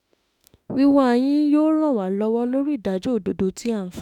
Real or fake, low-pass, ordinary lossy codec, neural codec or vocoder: fake; 19.8 kHz; none; autoencoder, 48 kHz, 32 numbers a frame, DAC-VAE, trained on Japanese speech